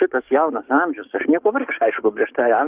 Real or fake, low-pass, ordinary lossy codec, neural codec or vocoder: fake; 3.6 kHz; Opus, 24 kbps; codec, 44.1 kHz, 7.8 kbps, DAC